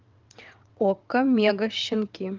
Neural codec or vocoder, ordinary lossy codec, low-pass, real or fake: vocoder, 22.05 kHz, 80 mel bands, WaveNeXt; Opus, 24 kbps; 7.2 kHz; fake